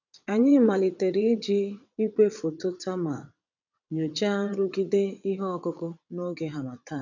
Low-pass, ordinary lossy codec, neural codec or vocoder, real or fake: 7.2 kHz; none; vocoder, 22.05 kHz, 80 mel bands, WaveNeXt; fake